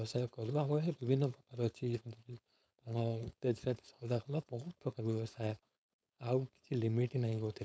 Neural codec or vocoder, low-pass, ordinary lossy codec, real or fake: codec, 16 kHz, 4.8 kbps, FACodec; none; none; fake